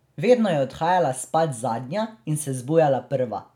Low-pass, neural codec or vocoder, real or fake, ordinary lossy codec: 19.8 kHz; none; real; none